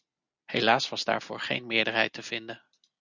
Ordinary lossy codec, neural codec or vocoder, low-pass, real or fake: Opus, 64 kbps; none; 7.2 kHz; real